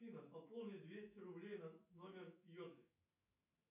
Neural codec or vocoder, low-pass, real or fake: autoencoder, 48 kHz, 128 numbers a frame, DAC-VAE, trained on Japanese speech; 3.6 kHz; fake